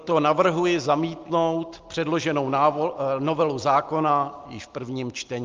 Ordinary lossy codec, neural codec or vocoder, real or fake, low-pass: Opus, 24 kbps; none; real; 7.2 kHz